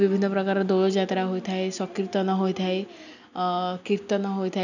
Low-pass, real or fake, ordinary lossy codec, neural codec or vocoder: 7.2 kHz; real; none; none